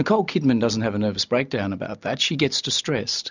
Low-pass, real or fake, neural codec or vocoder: 7.2 kHz; real; none